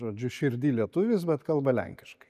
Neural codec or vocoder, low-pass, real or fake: none; 14.4 kHz; real